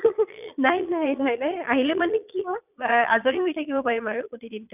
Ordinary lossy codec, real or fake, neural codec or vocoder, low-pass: none; fake; vocoder, 22.05 kHz, 80 mel bands, Vocos; 3.6 kHz